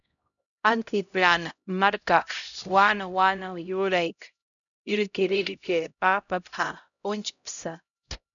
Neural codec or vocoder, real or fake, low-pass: codec, 16 kHz, 0.5 kbps, X-Codec, HuBERT features, trained on LibriSpeech; fake; 7.2 kHz